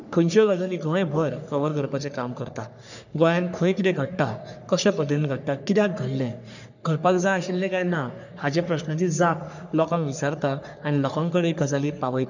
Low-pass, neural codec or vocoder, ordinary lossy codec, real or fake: 7.2 kHz; codec, 44.1 kHz, 3.4 kbps, Pupu-Codec; none; fake